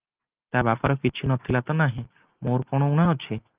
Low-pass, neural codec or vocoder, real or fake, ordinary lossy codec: 3.6 kHz; none; real; Opus, 32 kbps